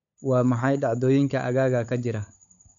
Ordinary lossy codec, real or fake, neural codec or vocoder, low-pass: none; fake; codec, 16 kHz, 16 kbps, FunCodec, trained on LibriTTS, 50 frames a second; 7.2 kHz